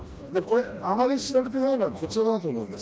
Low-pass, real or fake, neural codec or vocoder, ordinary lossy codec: none; fake; codec, 16 kHz, 1 kbps, FreqCodec, smaller model; none